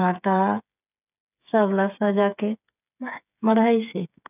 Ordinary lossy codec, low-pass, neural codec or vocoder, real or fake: none; 3.6 kHz; codec, 16 kHz, 8 kbps, FreqCodec, smaller model; fake